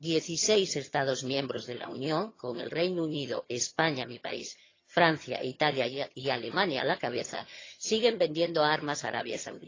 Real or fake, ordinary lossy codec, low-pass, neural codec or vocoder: fake; AAC, 32 kbps; 7.2 kHz; vocoder, 22.05 kHz, 80 mel bands, HiFi-GAN